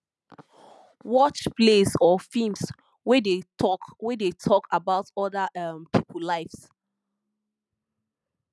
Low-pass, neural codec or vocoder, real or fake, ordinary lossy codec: none; none; real; none